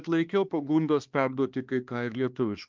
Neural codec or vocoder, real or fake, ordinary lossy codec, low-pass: codec, 16 kHz, 2 kbps, X-Codec, HuBERT features, trained on balanced general audio; fake; Opus, 24 kbps; 7.2 kHz